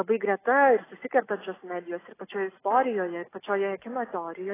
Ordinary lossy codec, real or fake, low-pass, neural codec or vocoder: AAC, 16 kbps; real; 3.6 kHz; none